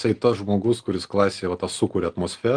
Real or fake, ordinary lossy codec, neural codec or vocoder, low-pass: real; Opus, 32 kbps; none; 9.9 kHz